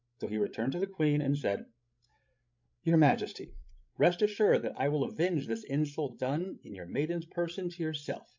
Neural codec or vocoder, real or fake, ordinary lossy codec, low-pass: codec, 16 kHz, 16 kbps, FreqCodec, larger model; fake; MP3, 64 kbps; 7.2 kHz